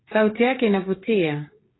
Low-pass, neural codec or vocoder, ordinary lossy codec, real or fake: 7.2 kHz; codec, 16 kHz, 8 kbps, FreqCodec, smaller model; AAC, 16 kbps; fake